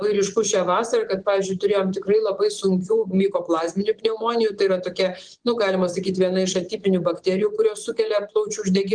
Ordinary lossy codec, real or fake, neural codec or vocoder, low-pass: Opus, 24 kbps; fake; vocoder, 24 kHz, 100 mel bands, Vocos; 9.9 kHz